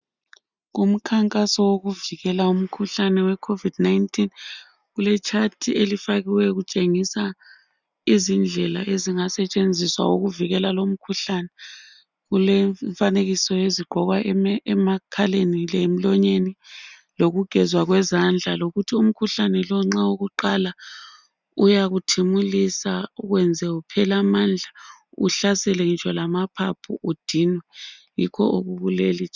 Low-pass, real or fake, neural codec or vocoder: 7.2 kHz; real; none